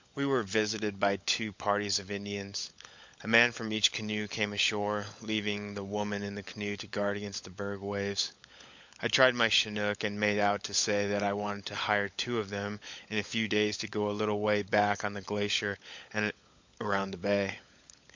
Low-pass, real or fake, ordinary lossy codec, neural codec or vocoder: 7.2 kHz; fake; MP3, 64 kbps; codec, 16 kHz, 16 kbps, FunCodec, trained on LibriTTS, 50 frames a second